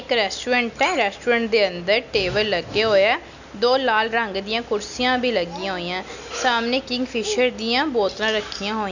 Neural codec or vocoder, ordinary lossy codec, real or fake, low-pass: none; none; real; 7.2 kHz